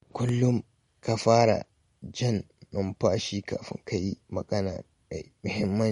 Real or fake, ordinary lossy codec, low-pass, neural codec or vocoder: real; MP3, 48 kbps; 19.8 kHz; none